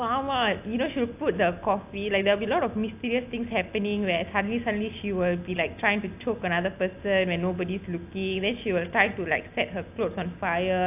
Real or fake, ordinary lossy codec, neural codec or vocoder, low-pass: real; AAC, 32 kbps; none; 3.6 kHz